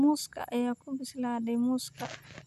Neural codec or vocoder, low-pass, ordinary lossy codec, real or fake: none; 14.4 kHz; none; real